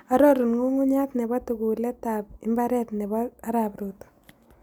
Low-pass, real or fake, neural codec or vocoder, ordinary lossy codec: none; real; none; none